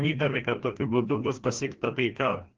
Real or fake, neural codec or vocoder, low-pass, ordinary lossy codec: fake; codec, 16 kHz, 1 kbps, FreqCodec, larger model; 7.2 kHz; Opus, 16 kbps